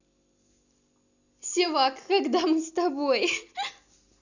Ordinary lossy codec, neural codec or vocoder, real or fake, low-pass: none; none; real; 7.2 kHz